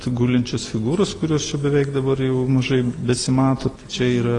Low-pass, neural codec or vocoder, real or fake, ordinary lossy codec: 10.8 kHz; none; real; AAC, 32 kbps